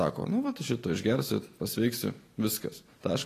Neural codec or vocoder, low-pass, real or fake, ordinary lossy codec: none; 14.4 kHz; real; AAC, 48 kbps